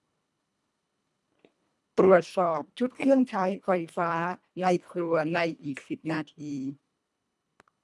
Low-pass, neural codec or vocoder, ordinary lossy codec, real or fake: none; codec, 24 kHz, 1.5 kbps, HILCodec; none; fake